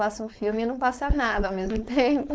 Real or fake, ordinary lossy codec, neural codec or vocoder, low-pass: fake; none; codec, 16 kHz, 4.8 kbps, FACodec; none